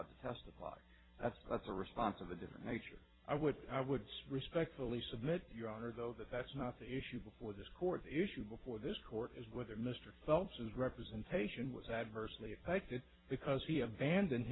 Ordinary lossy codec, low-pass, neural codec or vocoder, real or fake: AAC, 16 kbps; 7.2 kHz; vocoder, 44.1 kHz, 128 mel bands every 256 samples, BigVGAN v2; fake